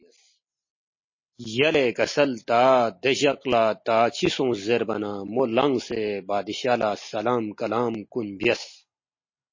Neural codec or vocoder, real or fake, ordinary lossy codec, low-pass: none; real; MP3, 32 kbps; 7.2 kHz